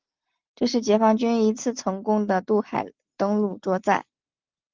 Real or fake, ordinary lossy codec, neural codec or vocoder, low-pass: real; Opus, 32 kbps; none; 7.2 kHz